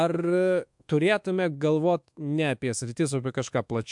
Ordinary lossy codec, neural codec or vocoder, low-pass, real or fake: MP3, 64 kbps; codec, 24 kHz, 1.2 kbps, DualCodec; 10.8 kHz; fake